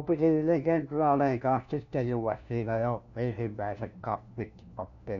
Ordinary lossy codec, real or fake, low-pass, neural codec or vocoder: none; fake; 7.2 kHz; codec, 16 kHz, 1 kbps, FunCodec, trained on LibriTTS, 50 frames a second